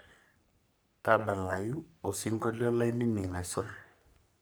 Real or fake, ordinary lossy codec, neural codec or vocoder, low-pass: fake; none; codec, 44.1 kHz, 3.4 kbps, Pupu-Codec; none